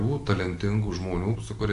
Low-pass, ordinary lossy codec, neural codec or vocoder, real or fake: 10.8 kHz; AAC, 64 kbps; none; real